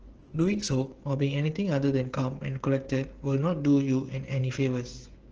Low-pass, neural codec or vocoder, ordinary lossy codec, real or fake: 7.2 kHz; codec, 16 kHz, 16 kbps, FreqCodec, smaller model; Opus, 16 kbps; fake